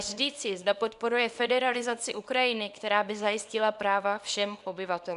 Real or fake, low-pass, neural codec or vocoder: fake; 10.8 kHz; codec, 24 kHz, 0.9 kbps, WavTokenizer, small release